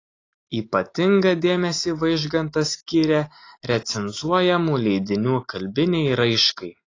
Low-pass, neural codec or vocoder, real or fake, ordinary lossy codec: 7.2 kHz; none; real; AAC, 32 kbps